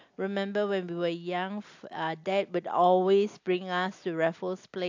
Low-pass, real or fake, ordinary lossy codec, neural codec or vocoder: 7.2 kHz; real; AAC, 48 kbps; none